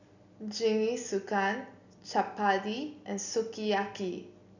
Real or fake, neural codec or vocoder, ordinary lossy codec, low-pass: real; none; none; 7.2 kHz